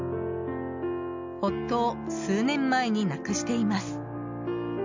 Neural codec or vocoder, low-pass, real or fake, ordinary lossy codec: none; 7.2 kHz; real; MP3, 64 kbps